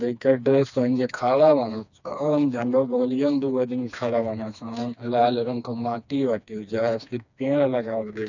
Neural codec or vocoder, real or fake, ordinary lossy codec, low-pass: codec, 16 kHz, 2 kbps, FreqCodec, smaller model; fake; none; 7.2 kHz